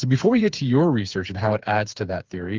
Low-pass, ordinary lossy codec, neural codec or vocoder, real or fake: 7.2 kHz; Opus, 32 kbps; codec, 16 kHz, 4 kbps, FreqCodec, smaller model; fake